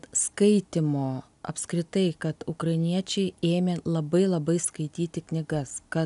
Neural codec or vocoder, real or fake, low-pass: none; real; 10.8 kHz